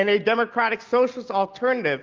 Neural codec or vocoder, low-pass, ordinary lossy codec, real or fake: none; 7.2 kHz; Opus, 32 kbps; real